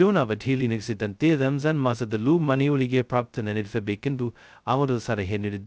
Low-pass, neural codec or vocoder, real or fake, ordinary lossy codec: none; codec, 16 kHz, 0.2 kbps, FocalCodec; fake; none